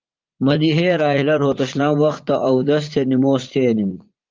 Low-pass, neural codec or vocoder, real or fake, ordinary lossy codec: 7.2 kHz; vocoder, 44.1 kHz, 80 mel bands, Vocos; fake; Opus, 32 kbps